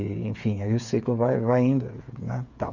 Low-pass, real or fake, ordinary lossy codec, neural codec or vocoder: 7.2 kHz; fake; none; codec, 16 kHz, 8 kbps, FreqCodec, smaller model